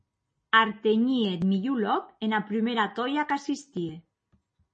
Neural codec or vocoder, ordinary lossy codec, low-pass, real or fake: none; MP3, 32 kbps; 9.9 kHz; real